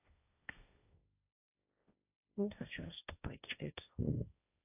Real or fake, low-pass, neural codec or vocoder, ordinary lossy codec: fake; 3.6 kHz; codec, 16 kHz, 1.1 kbps, Voila-Tokenizer; AAC, 32 kbps